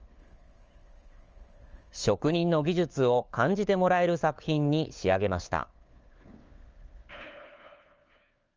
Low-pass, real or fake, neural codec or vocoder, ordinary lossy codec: 7.2 kHz; fake; codec, 16 kHz, 4 kbps, FunCodec, trained on LibriTTS, 50 frames a second; Opus, 24 kbps